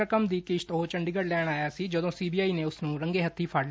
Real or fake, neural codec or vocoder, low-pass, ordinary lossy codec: real; none; none; none